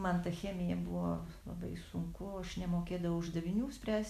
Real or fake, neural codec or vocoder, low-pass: real; none; 14.4 kHz